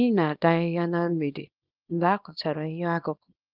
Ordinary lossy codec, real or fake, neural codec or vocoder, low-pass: Opus, 32 kbps; fake; codec, 24 kHz, 0.9 kbps, WavTokenizer, small release; 5.4 kHz